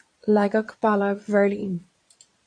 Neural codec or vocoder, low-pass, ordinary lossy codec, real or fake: vocoder, 22.05 kHz, 80 mel bands, Vocos; 9.9 kHz; AAC, 48 kbps; fake